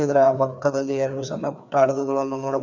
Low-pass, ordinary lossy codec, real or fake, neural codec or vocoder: 7.2 kHz; none; fake; codec, 16 kHz, 2 kbps, FreqCodec, larger model